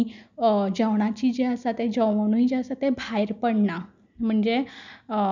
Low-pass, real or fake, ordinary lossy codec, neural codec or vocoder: 7.2 kHz; real; none; none